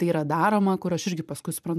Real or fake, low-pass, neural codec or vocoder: fake; 14.4 kHz; vocoder, 44.1 kHz, 128 mel bands every 512 samples, BigVGAN v2